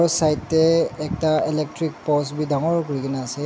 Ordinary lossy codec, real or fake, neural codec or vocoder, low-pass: none; real; none; none